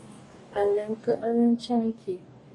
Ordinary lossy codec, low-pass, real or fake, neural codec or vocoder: AAC, 32 kbps; 10.8 kHz; fake; codec, 44.1 kHz, 2.6 kbps, DAC